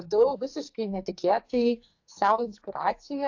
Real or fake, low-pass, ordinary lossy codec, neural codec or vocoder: fake; 7.2 kHz; AAC, 48 kbps; codec, 44.1 kHz, 2.6 kbps, SNAC